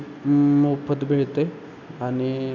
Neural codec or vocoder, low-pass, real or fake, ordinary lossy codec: none; 7.2 kHz; real; none